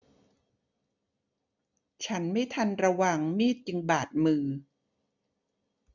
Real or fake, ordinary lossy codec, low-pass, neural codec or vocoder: real; none; 7.2 kHz; none